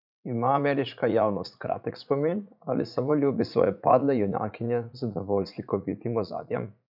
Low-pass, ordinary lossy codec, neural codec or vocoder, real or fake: 5.4 kHz; none; vocoder, 44.1 kHz, 80 mel bands, Vocos; fake